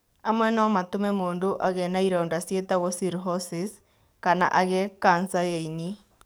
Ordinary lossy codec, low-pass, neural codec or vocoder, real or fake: none; none; codec, 44.1 kHz, 7.8 kbps, DAC; fake